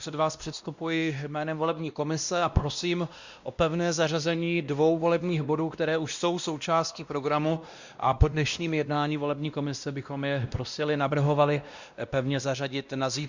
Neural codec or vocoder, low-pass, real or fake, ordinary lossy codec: codec, 16 kHz, 1 kbps, X-Codec, WavLM features, trained on Multilingual LibriSpeech; 7.2 kHz; fake; Opus, 64 kbps